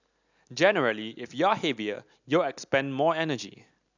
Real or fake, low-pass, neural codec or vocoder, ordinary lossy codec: real; 7.2 kHz; none; none